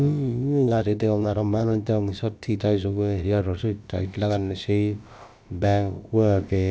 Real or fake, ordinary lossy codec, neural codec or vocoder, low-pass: fake; none; codec, 16 kHz, about 1 kbps, DyCAST, with the encoder's durations; none